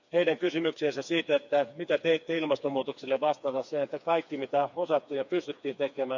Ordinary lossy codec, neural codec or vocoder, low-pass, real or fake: none; codec, 16 kHz, 4 kbps, FreqCodec, smaller model; 7.2 kHz; fake